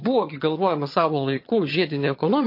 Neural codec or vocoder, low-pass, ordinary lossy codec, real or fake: vocoder, 22.05 kHz, 80 mel bands, HiFi-GAN; 5.4 kHz; MP3, 32 kbps; fake